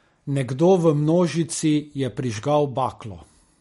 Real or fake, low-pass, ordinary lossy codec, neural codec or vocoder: real; 19.8 kHz; MP3, 48 kbps; none